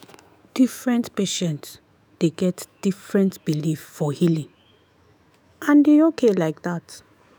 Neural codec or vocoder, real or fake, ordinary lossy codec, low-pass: autoencoder, 48 kHz, 128 numbers a frame, DAC-VAE, trained on Japanese speech; fake; none; none